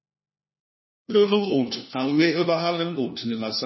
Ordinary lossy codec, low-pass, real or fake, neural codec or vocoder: MP3, 24 kbps; 7.2 kHz; fake; codec, 16 kHz, 1 kbps, FunCodec, trained on LibriTTS, 50 frames a second